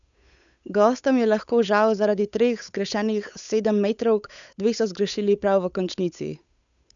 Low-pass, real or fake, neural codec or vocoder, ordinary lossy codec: 7.2 kHz; fake; codec, 16 kHz, 8 kbps, FunCodec, trained on Chinese and English, 25 frames a second; none